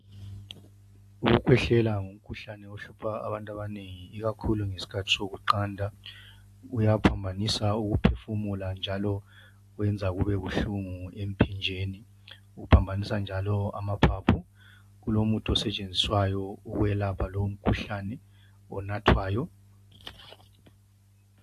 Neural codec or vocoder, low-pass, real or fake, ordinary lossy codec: none; 14.4 kHz; real; AAC, 64 kbps